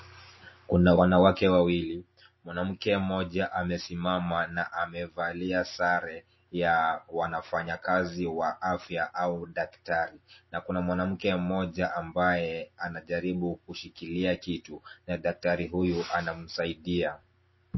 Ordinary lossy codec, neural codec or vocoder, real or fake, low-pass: MP3, 24 kbps; none; real; 7.2 kHz